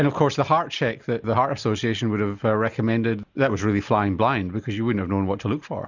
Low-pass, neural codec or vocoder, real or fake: 7.2 kHz; none; real